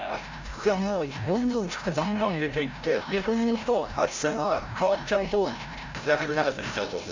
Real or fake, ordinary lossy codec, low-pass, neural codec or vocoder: fake; MP3, 64 kbps; 7.2 kHz; codec, 16 kHz, 0.5 kbps, FreqCodec, larger model